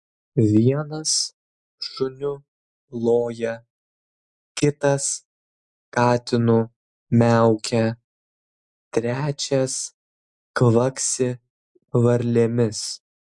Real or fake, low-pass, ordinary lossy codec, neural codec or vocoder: real; 10.8 kHz; MP3, 64 kbps; none